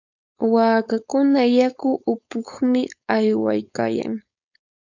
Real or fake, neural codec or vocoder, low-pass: fake; codec, 16 kHz, 4.8 kbps, FACodec; 7.2 kHz